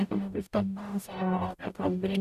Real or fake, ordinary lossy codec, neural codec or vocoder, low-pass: fake; none; codec, 44.1 kHz, 0.9 kbps, DAC; 14.4 kHz